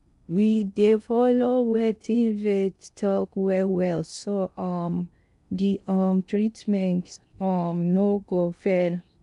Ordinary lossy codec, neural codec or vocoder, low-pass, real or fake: none; codec, 16 kHz in and 24 kHz out, 0.6 kbps, FocalCodec, streaming, 4096 codes; 10.8 kHz; fake